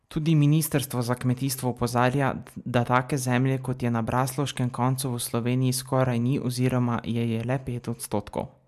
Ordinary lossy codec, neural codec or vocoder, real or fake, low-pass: MP3, 96 kbps; none; real; 14.4 kHz